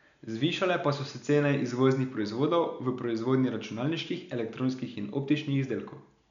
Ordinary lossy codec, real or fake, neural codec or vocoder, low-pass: none; real; none; 7.2 kHz